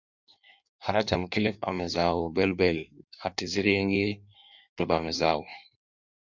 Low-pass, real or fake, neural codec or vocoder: 7.2 kHz; fake; codec, 16 kHz in and 24 kHz out, 1.1 kbps, FireRedTTS-2 codec